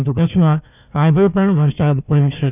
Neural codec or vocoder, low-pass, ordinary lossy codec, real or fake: codec, 16 kHz, 1 kbps, FunCodec, trained on Chinese and English, 50 frames a second; 3.6 kHz; none; fake